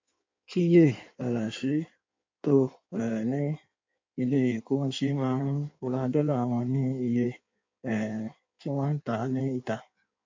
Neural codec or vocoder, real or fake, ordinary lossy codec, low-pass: codec, 16 kHz in and 24 kHz out, 1.1 kbps, FireRedTTS-2 codec; fake; MP3, 48 kbps; 7.2 kHz